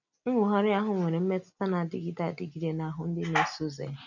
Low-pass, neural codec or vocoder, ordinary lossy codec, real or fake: 7.2 kHz; none; none; real